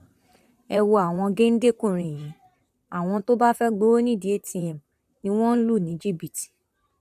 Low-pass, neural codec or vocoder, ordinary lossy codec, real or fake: 14.4 kHz; vocoder, 44.1 kHz, 128 mel bands, Pupu-Vocoder; none; fake